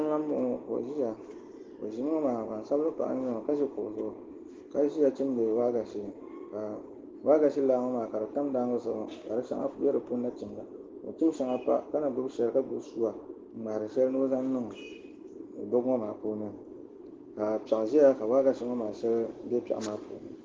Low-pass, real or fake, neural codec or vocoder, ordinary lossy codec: 7.2 kHz; real; none; Opus, 16 kbps